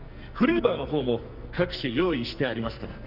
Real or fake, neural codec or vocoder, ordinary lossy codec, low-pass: fake; codec, 44.1 kHz, 2.6 kbps, SNAC; none; 5.4 kHz